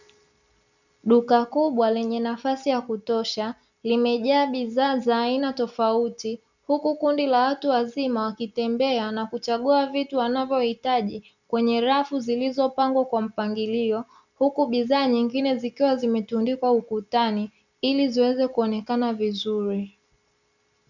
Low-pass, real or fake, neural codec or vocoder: 7.2 kHz; real; none